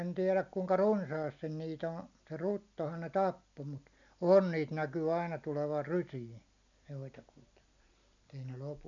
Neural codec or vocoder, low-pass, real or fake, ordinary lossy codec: none; 7.2 kHz; real; none